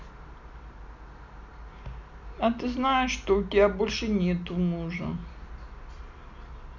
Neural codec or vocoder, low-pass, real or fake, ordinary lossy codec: none; 7.2 kHz; real; none